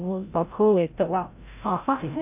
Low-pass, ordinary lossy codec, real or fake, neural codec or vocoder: 3.6 kHz; AAC, 32 kbps; fake; codec, 16 kHz, 0.5 kbps, FreqCodec, larger model